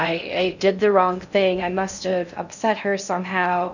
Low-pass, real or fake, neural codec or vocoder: 7.2 kHz; fake; codec, 16 kHz in and 24 kHz out, 0.6 kbps, FocalCodec, streaming, 2048 codes